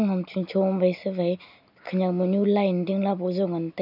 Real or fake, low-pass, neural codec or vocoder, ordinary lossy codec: real; 5.4 kHz; none; none